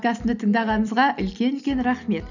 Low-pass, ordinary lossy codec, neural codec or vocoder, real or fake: 7.2 kHz; none; none; real